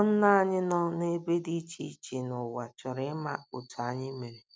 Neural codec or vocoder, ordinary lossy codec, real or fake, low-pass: none; none; real; none